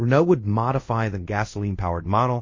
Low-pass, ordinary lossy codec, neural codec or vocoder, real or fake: 7.2 kHz; MP3, 32 kbps; codec, 24 kHz, 0.5 kbps, DualCodec; fake